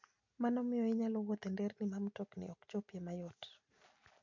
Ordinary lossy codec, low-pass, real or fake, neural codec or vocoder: MP3, 48 kbps; 7.2 kHz; real; none